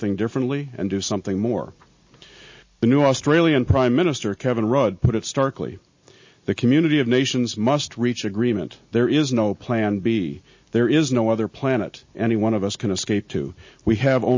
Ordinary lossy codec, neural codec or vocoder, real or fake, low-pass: MP3, 32 kbps; none; real; 7.2 kHz